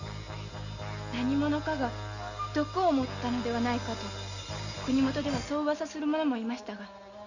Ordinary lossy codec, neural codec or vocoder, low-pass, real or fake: none; none; 7.2 kHz; real